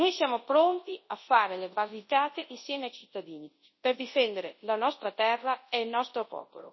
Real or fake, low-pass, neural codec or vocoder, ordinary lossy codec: fake; 7.2 kHz; codec, 24 kHz, 0.9 kbps, WavTokenizer, large speech release; MP3, 24 kbps